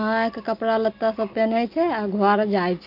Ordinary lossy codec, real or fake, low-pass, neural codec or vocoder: none; real; 5.4 kHz; none